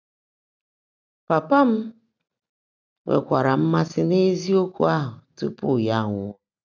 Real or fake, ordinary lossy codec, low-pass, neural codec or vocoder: real; none; 7.2 kHz; none